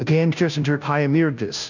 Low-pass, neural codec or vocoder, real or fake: 7.2 kHz; codec, 16 kHz, 0.5 kbps, FunCodec, trained on Chinese and English, 25 frames a second; fake